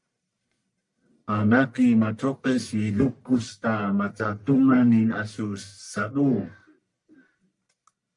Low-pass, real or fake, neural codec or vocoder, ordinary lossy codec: 10.8 kHz; fake; codec, 44.1 kHz, 1.7 kbps, Pupu-Codec; MP3, 64 kbps